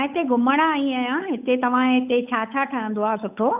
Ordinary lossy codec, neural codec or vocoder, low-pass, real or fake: none; codec, 16 kHz, 8 kbps, FunCodec, trained on Chinese and English, 25 frames a second; 3.6 kHz; fake